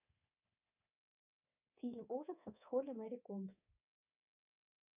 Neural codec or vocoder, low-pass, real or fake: codec, 24 kHz, 3.1 kbps, DualCodec; 3.6 kHz; fake